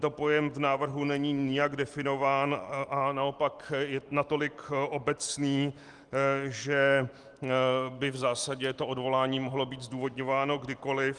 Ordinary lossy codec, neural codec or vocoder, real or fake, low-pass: Opus, 24 kbps; none; real; 10.8 kHz